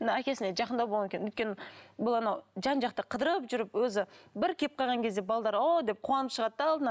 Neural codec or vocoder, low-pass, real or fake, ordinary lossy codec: none; none; real; none